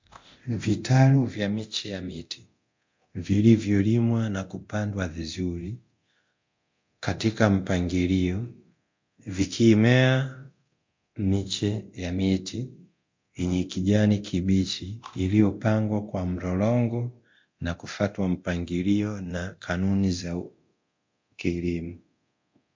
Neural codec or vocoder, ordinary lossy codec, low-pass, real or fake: codec, 24 kHz, 0.9 kbps, DualCodec; MP3, 48 kbps; 7.2 kHz; fake